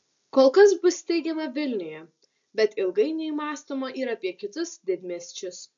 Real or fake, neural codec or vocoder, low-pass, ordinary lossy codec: real; none; 7.2 kHz; AAC, 64 kbps